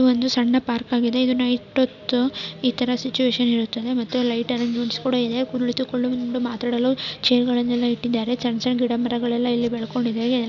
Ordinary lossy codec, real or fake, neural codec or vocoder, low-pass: none; real; none; 7.2 kHz